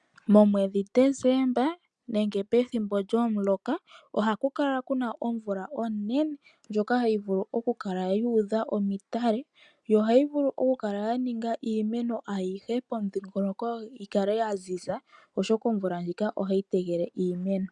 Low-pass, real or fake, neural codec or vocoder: 10.8 kHz; real; none